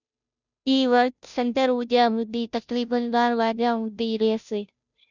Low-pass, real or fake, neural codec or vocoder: 7.2 kHz; fake; codec, 16 kHz, 0.5 kbps, FunCodec, trained on Chinese and English, 25 frames a second